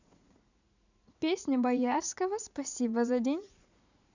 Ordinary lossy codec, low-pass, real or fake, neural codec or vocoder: none; 7.2 kHz; fake; vocoder, 44.1 kHz, 80 mel bands, Vocos